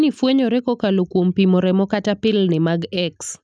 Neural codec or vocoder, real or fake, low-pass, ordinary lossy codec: none; real; 9.9 kHz; none